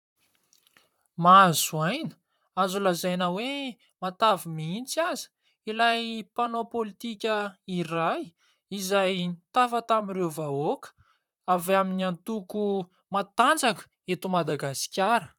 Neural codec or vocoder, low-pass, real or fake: vocoder, 44.1 kHz, 128 mel bands, Pupu-Vocoder; 19.8 kHz; fake